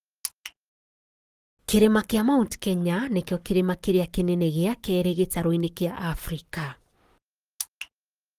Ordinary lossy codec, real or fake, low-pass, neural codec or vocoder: Opus, 24 kbps; fake; 14.4 kHz; codec, 44.1 kHz, 7.8 kbps, Pupu-Codec